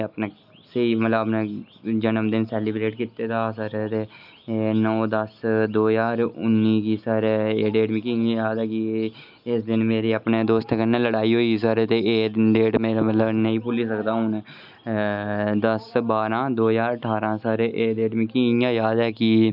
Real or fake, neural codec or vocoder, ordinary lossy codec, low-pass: real; none; none; 5.4 kHz